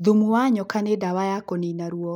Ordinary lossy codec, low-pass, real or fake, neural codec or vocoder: none; 19.8 kHz; real; none